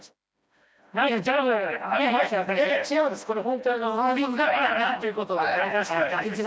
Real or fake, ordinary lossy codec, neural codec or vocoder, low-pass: fake; none; codec, 16 kHz, 1 kbps, FreqCodec, smaller model; none